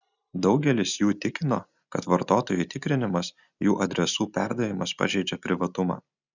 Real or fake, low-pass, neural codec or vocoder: real; 7.2 kHz; none